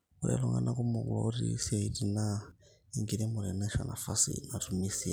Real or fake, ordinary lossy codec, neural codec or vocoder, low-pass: real; none; none; none